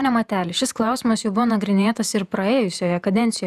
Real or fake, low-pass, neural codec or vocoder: fake; 14.4 kHz; vocoder, 48 kHz, 128 mel bands, Vocos